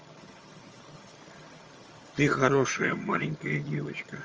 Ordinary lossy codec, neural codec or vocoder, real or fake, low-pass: Opus, 24 kbps; vocoder, 22.05 kHz, 80 mel bands, HiFi-GAN; fake; 7.2 kHz